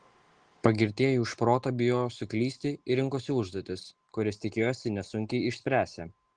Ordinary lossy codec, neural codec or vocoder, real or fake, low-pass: Opus, 16 kbps; none; real; 9.9 kHz